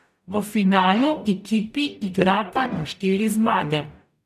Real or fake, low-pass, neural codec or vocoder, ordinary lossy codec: fake; 14.4 kHz; codec, 44.1 kHz, 0.9 kbps, DAC; none